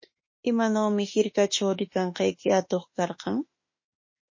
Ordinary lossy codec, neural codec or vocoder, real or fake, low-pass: MP3, 32 kbps; autoencoder, 48 kHz, 32 numbers a frame, DAC-VAE, trained on Japanese speech; fake; 7.2 kHz